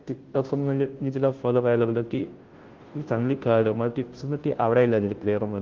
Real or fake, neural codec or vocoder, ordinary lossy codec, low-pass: fake; codec, 16 kHz, 0.5 kbps, FunCodec, trained on Chinese and English, 25 frames a second; Opus, 16 kbps; 7.2 kHz